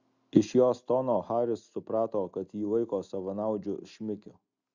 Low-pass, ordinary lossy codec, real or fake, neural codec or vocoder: 7.2 kHz; Opus, 64 kbps; real; none